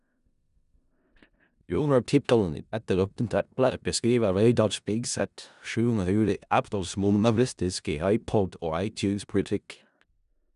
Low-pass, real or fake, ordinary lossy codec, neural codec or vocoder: 10.8 kHz; fake; none; codec, 16 kHz in and 24 kHz out, 0.4 kbps, LongCat-Audio-Codec, four codebook decoder